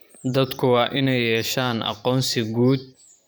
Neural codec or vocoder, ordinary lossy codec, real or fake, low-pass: vocoder, 44.1 kHz, 128 mel bands every 512 samples, BigVGAN v2; none; fake; none